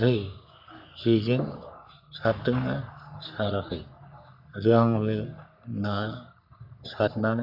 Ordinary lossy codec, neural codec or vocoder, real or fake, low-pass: none; codec, 44.1 kHz, 3.4 kbps, Pupu-Codec; fake; 5.4 kHz